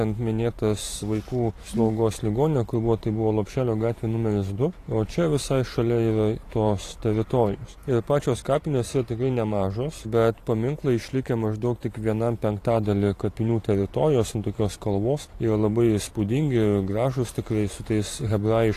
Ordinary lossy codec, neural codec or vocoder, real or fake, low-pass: AAC, 48 kbps; vocoder, 44.1 kHz, 128 mel bands every 512 samples, BigVGAN v2; fake; 14.4 kHz